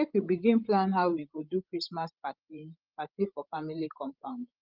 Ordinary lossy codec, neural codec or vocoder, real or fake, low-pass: Opus, 32 kbps; codec, 16 kHz, 16 kbps, FreqCodec, larger model; fake; 5.4 kHz